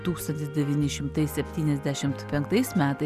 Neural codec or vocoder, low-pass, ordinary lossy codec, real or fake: none; 14.4 kHz; Opus, 64 kbps; real